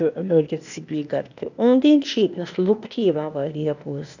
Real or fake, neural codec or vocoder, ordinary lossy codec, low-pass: fake; codec, 16 kHz, 0.8 kbps, ZipCodec; none; 7.2 kHz